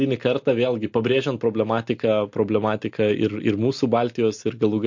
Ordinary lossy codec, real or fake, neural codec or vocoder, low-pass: MP3, 48 kbps; real; none; 7.2 kHz